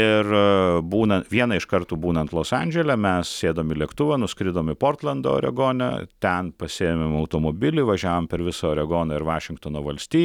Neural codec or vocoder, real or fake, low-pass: none; real; 19.8 kHz